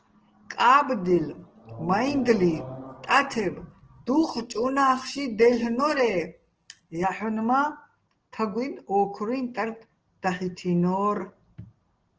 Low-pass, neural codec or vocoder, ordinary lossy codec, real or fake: 7.2 kHz; none; Opus, 16 kbps; real